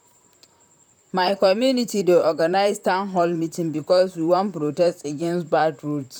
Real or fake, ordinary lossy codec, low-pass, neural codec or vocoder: fake; none; 19.8 kHz; vocoder, 44.1 kHz, 128 mel bands, Pupu-Vocoder